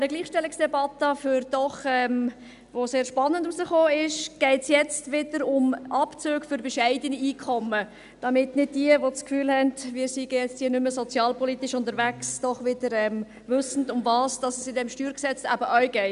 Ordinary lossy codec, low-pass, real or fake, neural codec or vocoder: none; 10.8 kHz; real; none